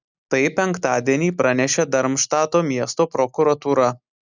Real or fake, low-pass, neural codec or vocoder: real; 7.2 kHz; none